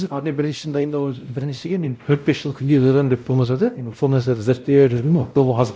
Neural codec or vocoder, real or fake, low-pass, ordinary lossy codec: codec, 16 kHz, 0.5 kbps, X-Codec, WavLM features, trained on Multilingual LibriSpeech; fake; none; none